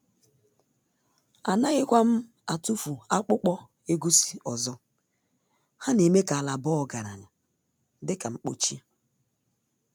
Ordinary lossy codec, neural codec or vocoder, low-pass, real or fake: none; none; none; real